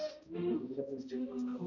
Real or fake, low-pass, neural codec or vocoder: fake; 7.2 kHz; codec, 16 kHz, 0.5 kbps, X-Codec, HuBERT features, trained on balanced general audio